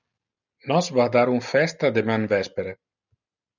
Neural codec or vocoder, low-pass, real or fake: none; 7.2 kHz; real